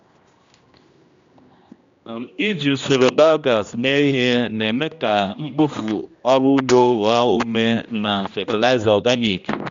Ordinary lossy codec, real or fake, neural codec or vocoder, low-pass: MP3, 64 kbps; fake; codec, 16 kHz, 1 kbps, X-Codec, HuBERT features, trained on general audio; 7.2 kHz